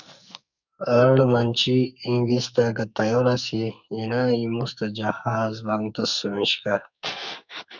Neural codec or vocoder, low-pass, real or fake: codec, 44.1 kHz, 2.6 kbps, SNAC; 7.2 kHz; fake